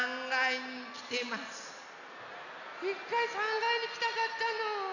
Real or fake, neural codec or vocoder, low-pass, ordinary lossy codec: real; none; 7.2 kHz; none